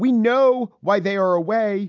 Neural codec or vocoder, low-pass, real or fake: none; 7.2 kHz; real